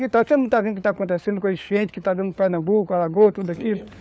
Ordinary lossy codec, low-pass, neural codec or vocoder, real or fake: none; none; codec, 16 kHz, 4 kbps, FunCodec, trained on LibriTTS, 50 frames a second; fake